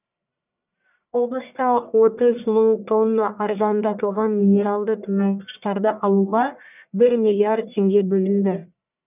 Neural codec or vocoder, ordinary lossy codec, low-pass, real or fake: codec, 44.1 kHz, 1.7 kbps, Pupu-Codec; none; 3.6 kHz; fake